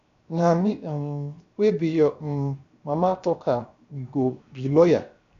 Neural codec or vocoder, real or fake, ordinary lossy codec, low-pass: codec, 16 kHz, 0.7 kbps, FocalCodec; fake; AAC, 64 kbps; 7.2 kHz